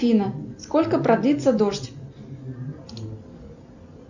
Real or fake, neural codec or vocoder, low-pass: real; none; 7.2 kHz